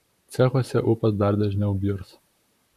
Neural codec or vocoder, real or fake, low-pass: vocoder, 44.1 kHz, 128 mel bands, Pupu-Vocoder; fake; 14.4 kHz